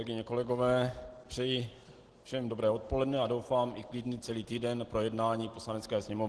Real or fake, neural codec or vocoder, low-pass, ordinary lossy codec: real; none; 10.8 kHz; Opus, 16 kbps